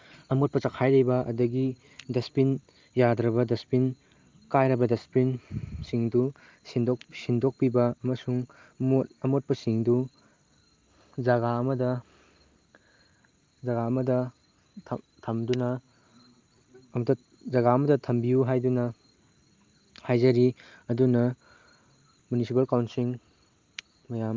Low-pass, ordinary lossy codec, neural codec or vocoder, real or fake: 7.2 kHz; Opus, 32 kbps; none; real